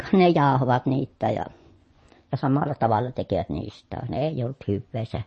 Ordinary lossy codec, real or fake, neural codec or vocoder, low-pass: MP3, 32 kbps; fake; vocoder, 24 kHz, 100 mel bands, Vocos; 10.8 kHz